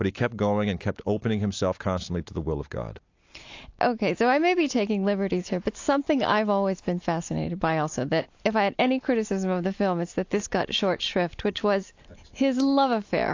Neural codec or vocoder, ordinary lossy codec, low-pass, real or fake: none; AAC, 48 kbps; 7.2 kHz; real